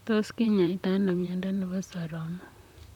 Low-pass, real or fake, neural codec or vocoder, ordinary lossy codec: 19.8 kHz; fake; vocoder, 44.1 kHz, 128 mel bands, Pupu-Vocoder; none